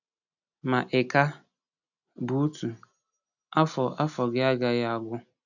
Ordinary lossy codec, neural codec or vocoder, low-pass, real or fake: none; none; 7.2 kHz; real